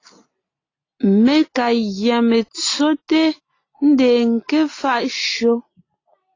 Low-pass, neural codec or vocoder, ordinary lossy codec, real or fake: 7.2 kHz; none; AAC, 32 kbps; real